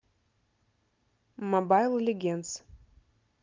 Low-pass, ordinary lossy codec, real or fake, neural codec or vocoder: 7.2 kHz; Opus, 32 kbps; real; none